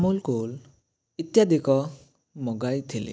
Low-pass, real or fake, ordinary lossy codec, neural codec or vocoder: none; real; none; none